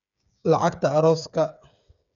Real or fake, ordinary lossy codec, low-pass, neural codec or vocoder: fake; none; 7.2 kHz; codec, 16 kHz, 8 kbps, FreqCodec, smaller model